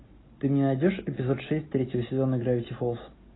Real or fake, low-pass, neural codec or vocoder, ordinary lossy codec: real; 7.2 kHz; none; AAC, 16 kbps